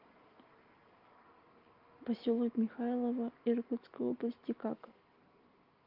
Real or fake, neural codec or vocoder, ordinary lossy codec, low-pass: real; none; Opus, 32 kbps; 5.4 kHz